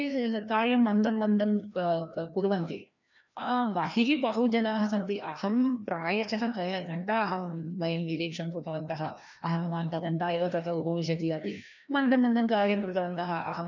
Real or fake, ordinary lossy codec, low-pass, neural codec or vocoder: fake; none; 7.2 kHz; codec, 16 kHz, 1 kbps, FreqCodec, larger model